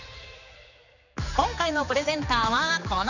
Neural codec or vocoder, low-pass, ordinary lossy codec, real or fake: codec, 16 kHz, 4 kbps, X-Codec, HuBERT features, trained on general audio; 7.2 kHz; none; fake